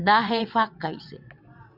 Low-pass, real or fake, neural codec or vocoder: 5.4 kHz; fake; vocoder, 22.05 kHz, 80 mel bands, WaveNeXt